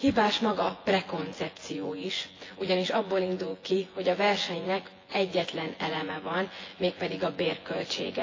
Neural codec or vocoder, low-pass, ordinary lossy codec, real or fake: vocoder, 24 kHz, 100 mel bands, Vocos; 7.2 kHz; AAC, 32 kbps; fake